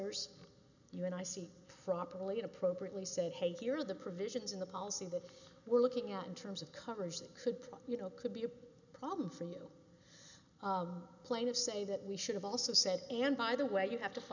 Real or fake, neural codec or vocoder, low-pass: real; none; 7.2 kHz